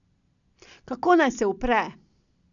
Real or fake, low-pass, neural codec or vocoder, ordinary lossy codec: real; 7.2 kHz; none; none